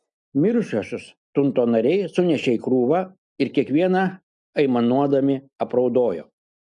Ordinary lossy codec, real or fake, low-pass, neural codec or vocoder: MP3, 64 kbps; real; 10.8 kHz; none